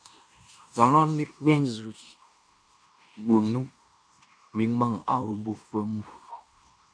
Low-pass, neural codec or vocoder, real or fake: 9.9 kHz; codec, 16 kHz in and 24 kHz out, 0.9 kbps, LongCat-Audio-Codec, fine tuned four codebook decoder; fake